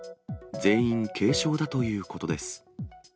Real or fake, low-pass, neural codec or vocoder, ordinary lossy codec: real; none; none; none